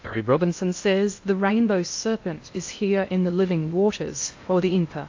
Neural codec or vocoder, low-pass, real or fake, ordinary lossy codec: codec, 16 kHz in and 24 kHz out, 0.6 kbps, FocalCodec, streaming, 2048 codes; 7.2 kHz; fake; AAC, 48 kbps